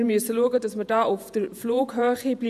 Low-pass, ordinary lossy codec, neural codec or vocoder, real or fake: 14.4 kHz; none; vocoder, 48 kHz, 128 mel bands, Vocos; fake